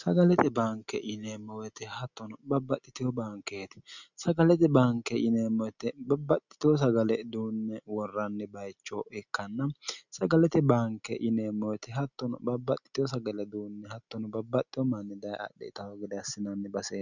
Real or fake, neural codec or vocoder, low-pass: real; none; 7.2 kHz